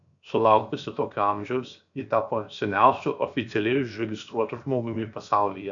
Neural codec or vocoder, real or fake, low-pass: codec, 16 kHz, 0.7 kbps, FocalCodec; fake; 7.2 kHz